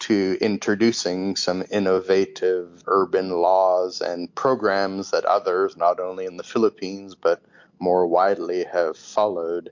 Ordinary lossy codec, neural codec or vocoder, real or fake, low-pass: MP3, 48 kbps; none; real; 7.2 kHz